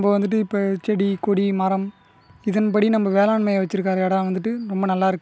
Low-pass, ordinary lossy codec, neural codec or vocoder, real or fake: none; none; none; real